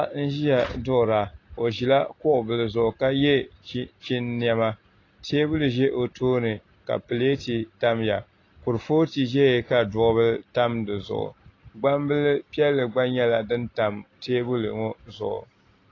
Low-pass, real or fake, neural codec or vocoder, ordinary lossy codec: 7.2 kHz; real; none; AAC, 32 kbps